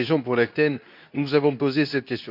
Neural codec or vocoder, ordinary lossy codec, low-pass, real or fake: codec, 24 kHz, 0.9 kbps, WavTokenizer, medium speech release version 2; none; 5.4 kHz; fake